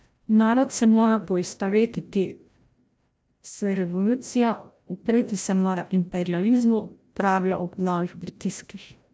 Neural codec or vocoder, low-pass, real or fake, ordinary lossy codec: codec, 16 kHz, 0.5 kbps, FreqCodec, larger model; none; fake; none